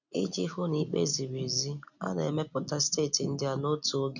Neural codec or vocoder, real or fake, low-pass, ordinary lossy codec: none; real; 7.2 kHz; none